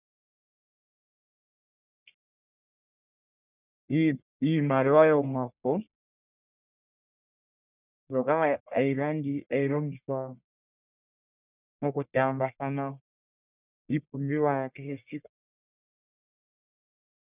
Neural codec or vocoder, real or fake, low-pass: codec, 44.1 kHz, 1.7 kbps, Pupu-Codec; fake; 3.6 kHz